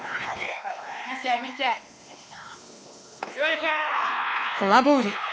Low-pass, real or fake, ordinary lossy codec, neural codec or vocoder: none; fake; none; codec, 16 kHz, 2 kbps, X-Codec, WavLM features, trained on Multilingual LibriSpeech